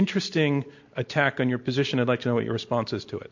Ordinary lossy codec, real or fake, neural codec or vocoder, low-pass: MP3, 48 kbps; real; none; 7.2 kHz